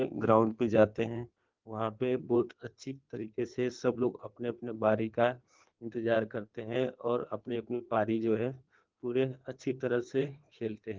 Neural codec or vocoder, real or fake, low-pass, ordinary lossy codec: codec, 16 kHz in and 24 kHz out, 1.1 kbps, FireRedTTS-2 codec; fake; 7.2 kHz; Opus, 16 kbps